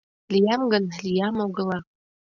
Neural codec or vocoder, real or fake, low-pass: none; real; 7.2 kHz